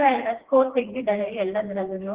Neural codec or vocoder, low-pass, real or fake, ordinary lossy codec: codec, 16 kHz, 2 kbps, FreqCodec, smaller model; 3.6 kHz; fake; Opus, 16 kbps